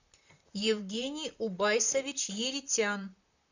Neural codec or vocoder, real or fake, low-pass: vocoder, 44.1 kHz, 128 mel bands, Pupu-Vocoder; fake; 7.2 kHz